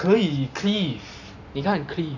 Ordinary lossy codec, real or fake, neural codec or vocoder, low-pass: none; fake; vocoder, 44.1 kHz, 128 mel bands every 512 samples, BigVGAN v2; 7.2 kHz